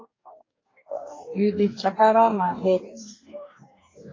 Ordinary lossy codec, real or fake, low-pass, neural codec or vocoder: AAC, 32 kbps; fake; 7.2 kHz; codec, 44.1 kHz, 2.6 kbps, DAC